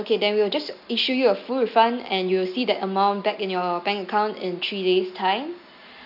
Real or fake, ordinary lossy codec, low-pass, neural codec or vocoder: real; none; 5.4 kHz; none